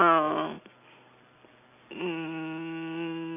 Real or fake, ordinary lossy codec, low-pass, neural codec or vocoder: real; none; 3.6 kHz; none